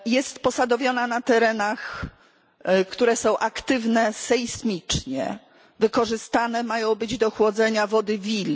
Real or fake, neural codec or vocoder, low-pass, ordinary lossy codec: real; none; none; none